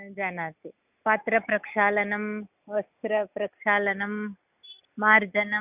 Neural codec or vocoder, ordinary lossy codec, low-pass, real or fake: none; none; 3.6 kHz; real